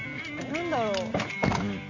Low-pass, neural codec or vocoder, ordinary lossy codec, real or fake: 7.2 kHz; none; none; real